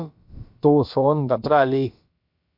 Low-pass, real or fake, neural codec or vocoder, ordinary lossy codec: 5.4 kHz; fake; codec, 16 kHz, about 1 kbps, DyCAST, with the encoder's durations; MP3, 48 kbps